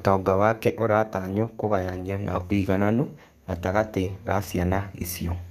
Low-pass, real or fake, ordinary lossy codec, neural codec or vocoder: 14.4 kHz; fake; none; codec, 32 kHz, 1.9 kbps, SNAC